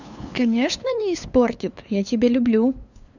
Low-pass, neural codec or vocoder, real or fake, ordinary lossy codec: 7.2 kHz; codec, 16 kHz, 2 kbps, FunCodec, trained on LibriTTS, 25 frames a second; fake; none